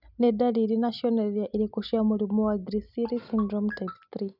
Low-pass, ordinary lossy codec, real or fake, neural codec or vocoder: 5.4 kHz; none; real; none